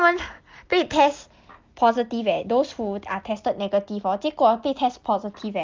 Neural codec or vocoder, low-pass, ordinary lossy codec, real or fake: none; 7.2 kHz; Opus, 32 kbps; real